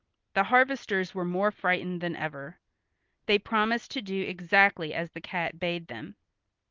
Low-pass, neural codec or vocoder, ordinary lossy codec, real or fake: 7.2 kHz; none; Opus, 32 kbps; real